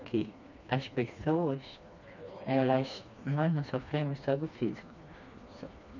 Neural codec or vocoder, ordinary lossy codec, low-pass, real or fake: codec, 16 kHz, 2 kbps, FreqCodec, smaller model; none; 7.2 kHz; fake